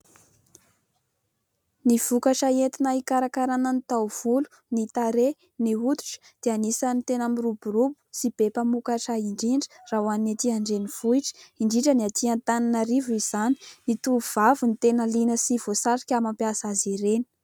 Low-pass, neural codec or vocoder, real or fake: 19.8 kHz; none; real